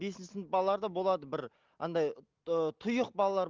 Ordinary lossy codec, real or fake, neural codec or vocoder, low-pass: Opus, 32 kbps; real; none; 7.2 kHz